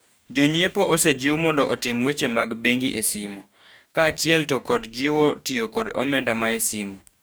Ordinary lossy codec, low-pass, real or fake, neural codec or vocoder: none; none; fake; codec, 44.1 kHz, 2.6 kbps, DAC